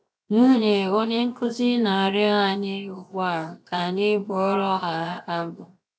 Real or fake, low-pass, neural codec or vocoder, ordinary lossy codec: fake; none; codec, 16 kHz, 0.7 kbps, FocalCodec; none